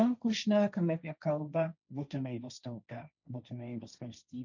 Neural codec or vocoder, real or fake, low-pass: codec, 16 kHz, 1.1 kbps, Voila-Tokenizer; fake; 7.2 kHz